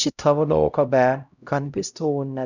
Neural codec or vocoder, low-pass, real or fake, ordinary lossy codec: codec, 16 kHz, 0.5 kbps, X-Codec, HuBERT features, trained on LibriSpeech; 7.2 kHz; fake; none